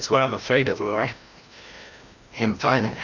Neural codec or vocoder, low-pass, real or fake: codec, 16 kHz, 1 kbps, FreqCodec, larger model; 7.2 kHz; fake